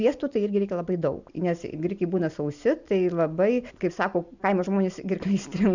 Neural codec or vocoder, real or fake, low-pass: none; real; 7.2 kHz